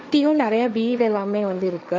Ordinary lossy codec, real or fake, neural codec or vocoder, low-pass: none; fake; codec, 16 kHz, 1.1 kbps, Voila-Tokenizer; none